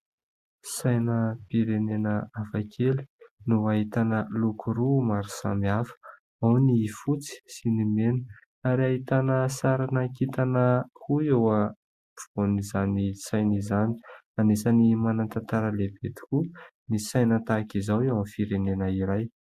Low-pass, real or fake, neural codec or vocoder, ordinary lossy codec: 14.4 kHz; real; none; Opus, 64 kbps